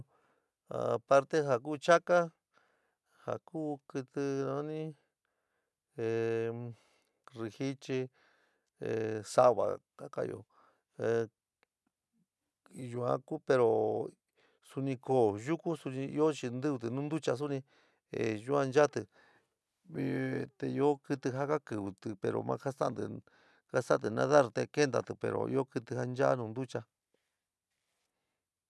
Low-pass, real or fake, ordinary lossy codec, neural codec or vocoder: none; real; none; none